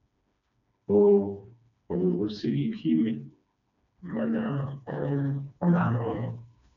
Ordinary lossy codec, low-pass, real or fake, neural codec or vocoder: none; 7.2 kHz; fake; codec, 16 kHz, 2 kbps, FreqCodec, smaller model